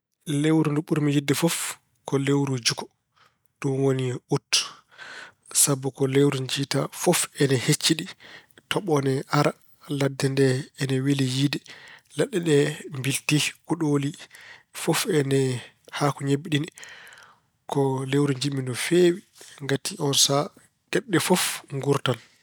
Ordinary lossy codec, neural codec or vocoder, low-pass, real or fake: none; none; none; real